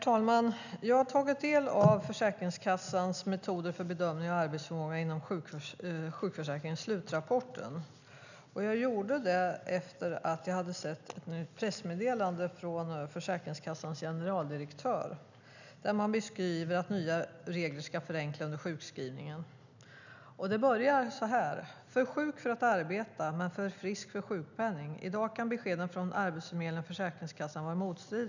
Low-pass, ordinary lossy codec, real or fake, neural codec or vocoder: 7.2 kHz; none; real; none